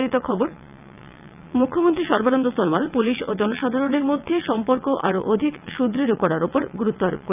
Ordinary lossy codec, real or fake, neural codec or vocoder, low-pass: none; fake; vocoder, 22.05 kHz, 80 mel bands, WaveNeXt; 3.6 kHz